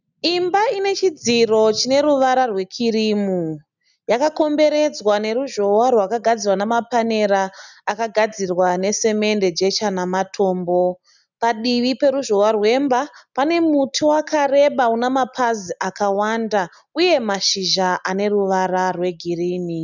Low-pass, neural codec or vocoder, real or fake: 7.2 kHz; none; real